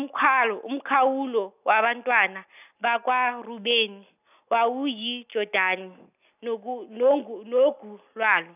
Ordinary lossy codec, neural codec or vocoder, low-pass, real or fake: none; none; 3.6 kHz; real